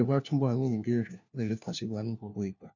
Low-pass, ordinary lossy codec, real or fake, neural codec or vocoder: 7.2 kHz; none; fake; codec, 16 kHz, 1 kbps, FunCodec, trained on LibriTTS, 50 frames a second